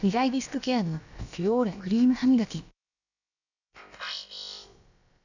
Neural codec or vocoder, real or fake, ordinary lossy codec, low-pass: codec, 16 kHz, about 1 kbps, DyCAST, with the encoder's durations; fake; none; 7.2 kHz